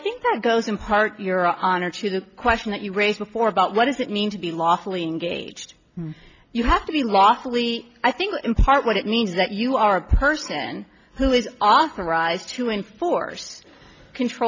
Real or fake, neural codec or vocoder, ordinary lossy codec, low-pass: real; none; MP3, 64 kbps; 7.2 kHz